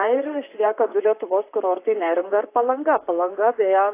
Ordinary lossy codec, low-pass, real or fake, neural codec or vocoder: AAC, 24 kbps; 3.6 kHz; fake; vocoder, 44.1 kHz, 128 mel bands, Pupu-Vocoder